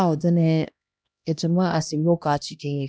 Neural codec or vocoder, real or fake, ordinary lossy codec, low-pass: codec, 16 kHz, 1 kbps, X-Codec, HuBERT features, trained on LibriSpeech; fake; none; none